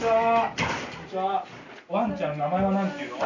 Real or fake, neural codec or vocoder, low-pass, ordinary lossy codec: real; none; 7.2 kHz; none